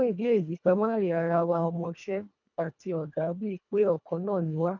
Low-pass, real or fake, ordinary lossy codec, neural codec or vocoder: 7.2 kHz; fake; none; codec, 24 kHz, 1.5 kbps, HILCodec